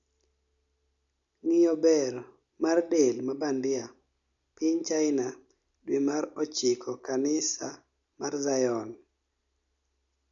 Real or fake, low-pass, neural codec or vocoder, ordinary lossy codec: real; 7.2 kHz; none; none